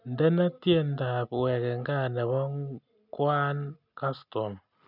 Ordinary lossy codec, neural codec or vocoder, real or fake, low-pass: none; none; real; 5.4 kHz